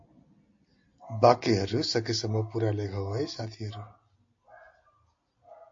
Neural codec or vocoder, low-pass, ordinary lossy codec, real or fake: none; 7.2 kHz; AAC, 48 kbps; real